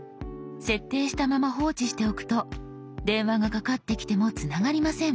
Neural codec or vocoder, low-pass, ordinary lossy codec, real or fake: none; none; none; real